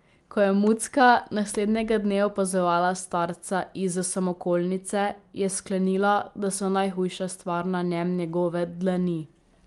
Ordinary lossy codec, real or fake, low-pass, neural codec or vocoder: Opus, 32 kbps; real; 10.8 kHz; none